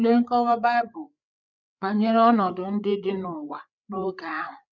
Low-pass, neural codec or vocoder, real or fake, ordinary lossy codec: 7.2 kHz; codec, 16 kHz, 8 kbps, FreqCodec, larger model; fake; none